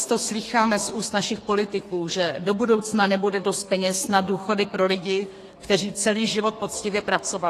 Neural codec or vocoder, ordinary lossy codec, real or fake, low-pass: codec, 32 kHz, 1.9 kbps, SNAC; AAC, 48 kbps; fake; 14.4 kHz